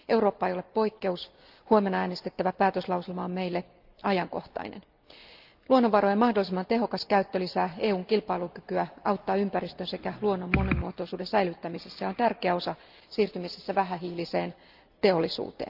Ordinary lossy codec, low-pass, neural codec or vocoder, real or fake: Opus, 24 kbps; 5.4 kHz; none; real